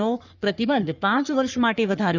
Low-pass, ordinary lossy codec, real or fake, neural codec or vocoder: 7.2 kHz; none; fake; codec, 44.1 kHz, 3.4 kbps, Pupu-Codec